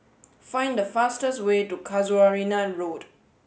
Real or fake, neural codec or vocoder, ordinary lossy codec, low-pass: real; none; none; none